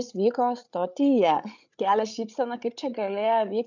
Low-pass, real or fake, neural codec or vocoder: 7.2 kHz; fake; codec, 16 kHz, 8 kbps, FreqCodec, larger model